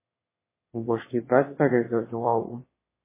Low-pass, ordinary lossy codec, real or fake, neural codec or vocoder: 3.6 kHz; MP3, 16 kbps; fake; autoencoder, 22.05 kHz, a latent of 192 numbers a frame, VITS, trained on one speaker